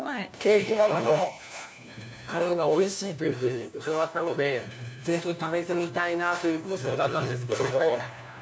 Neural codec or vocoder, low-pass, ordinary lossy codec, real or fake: codec, 16 kHz, 1 kbps, FunCodec, trained on LibriTTS, 50 frames a second; none; none; fake